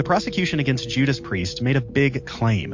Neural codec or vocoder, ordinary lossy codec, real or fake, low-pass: none; MP3, 48 kbps; real; 7.2 kHz